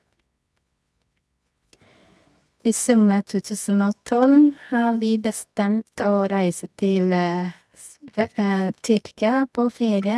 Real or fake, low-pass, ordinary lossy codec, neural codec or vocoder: fake; none; none; codec, 24 kHz, 0.9 kbps, WavTokenizer, medium music audio release